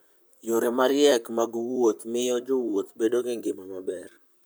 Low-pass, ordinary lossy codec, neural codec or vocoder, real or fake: none; none; vocoder, 44.1 kHz, 128 mel bands, Pupu-Vocoder; fake